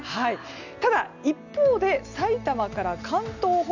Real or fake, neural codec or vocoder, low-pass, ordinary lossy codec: real; none; 7.2 kHz; none